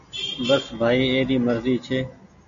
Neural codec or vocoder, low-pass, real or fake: none; 7.2 kHz; real